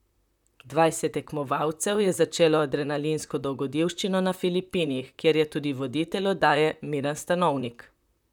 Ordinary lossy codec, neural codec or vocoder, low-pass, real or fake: none; vocoder, 44.1 kHz, 128 mel bands, Pupu-Vocoder; 19.8 kHz; fake